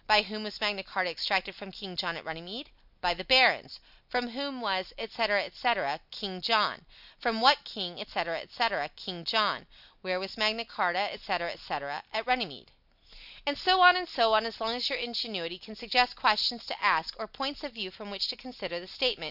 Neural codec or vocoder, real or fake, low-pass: none; real; 5.4 kHz